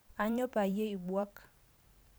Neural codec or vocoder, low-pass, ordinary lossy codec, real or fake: vocoder, 44.1 kHz, 128 mel bands every 512 samples, BigVGAN v2; none; none; fake